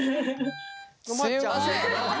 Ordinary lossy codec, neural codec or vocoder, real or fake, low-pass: none; none; real; none